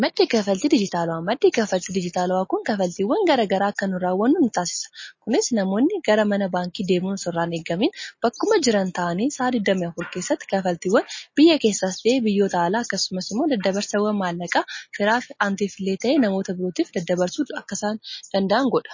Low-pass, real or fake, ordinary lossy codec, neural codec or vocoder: 7.2 kHz; real; MP3, 32 kbps; none